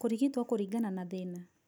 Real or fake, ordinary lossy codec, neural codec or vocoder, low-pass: real; none; none; none